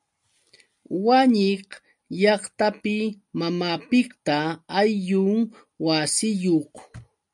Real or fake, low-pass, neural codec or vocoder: real; 10.8 kHz; none